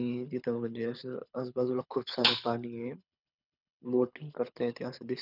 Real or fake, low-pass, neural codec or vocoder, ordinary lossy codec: fake; 5.4 kHz; codec, 24 kHz, 6 kbps, HILCodec; none